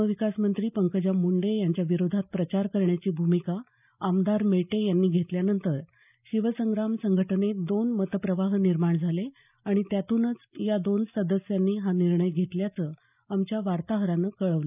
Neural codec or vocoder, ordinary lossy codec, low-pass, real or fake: none; none; 3.6 kHz; real